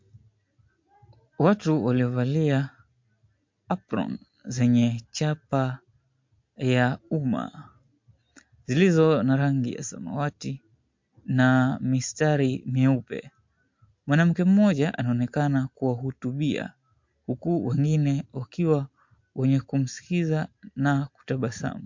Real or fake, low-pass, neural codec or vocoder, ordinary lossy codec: real; 7.2 kHz; none; MP3, 48 kbps